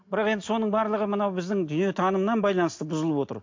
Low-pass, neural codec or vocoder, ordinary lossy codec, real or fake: 7.2 kHz; none; MP3, 48 kbps; real